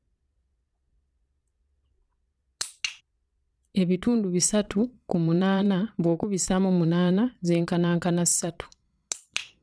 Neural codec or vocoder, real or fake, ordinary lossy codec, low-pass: vocoder, 22.05 kHz, 80 mel bands, Vocos; fake; none; none